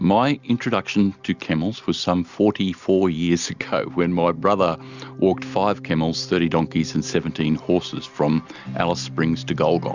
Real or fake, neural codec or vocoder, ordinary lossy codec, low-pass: real; none; Opus, 64 kbps; 7.2 kHz